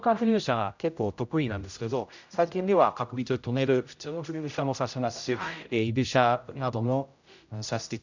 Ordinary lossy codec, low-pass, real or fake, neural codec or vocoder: none; 7.2 kHz; fake; codec, 16 kHz, 0.5 kbps, X-Codec, HuBERT features, trained on general audio